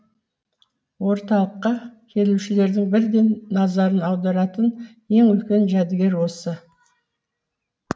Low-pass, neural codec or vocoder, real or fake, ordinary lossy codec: none; none; real; none